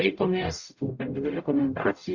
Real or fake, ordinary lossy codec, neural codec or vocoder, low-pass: fake; Opus, 64 kbps; codec, 44.1 kHz, 0.9 kbps, DAC; 7.2 kHz